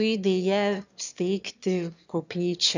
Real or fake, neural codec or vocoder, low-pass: fake; autoencoder, 22.05 kHz, a latent of 192 numbers a frame, VITS, trained on one speaker; 7.2 kHz